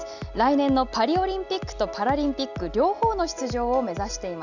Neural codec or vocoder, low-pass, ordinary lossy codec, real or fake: none; 7.2 kHz; none; real